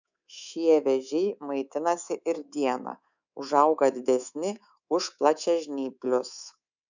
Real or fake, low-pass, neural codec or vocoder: fake; 7.2 kHz; codec, 24 kHz, 3.1 kbps, DualCodec